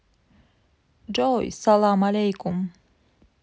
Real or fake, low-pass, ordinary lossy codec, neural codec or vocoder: real; none; none; none